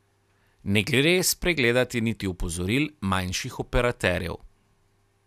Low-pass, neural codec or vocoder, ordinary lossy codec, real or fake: 14.4 kHz; none; none; real